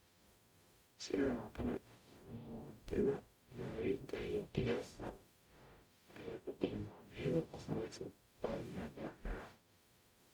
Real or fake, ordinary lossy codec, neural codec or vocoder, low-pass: fake; Opus, 64 kbps; codec, 44.1 kHz, 0.9 kbps, DAC; 19.8 kHz